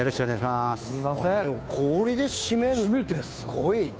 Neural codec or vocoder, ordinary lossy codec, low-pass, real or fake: codec, 16 kHz, 2 kbps, FunCodec, trained on Chinese and English, 25 frames a second; none; none; fake